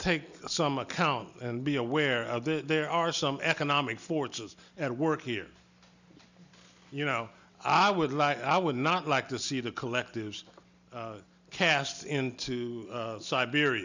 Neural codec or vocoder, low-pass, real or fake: none; 7.2 kHz; real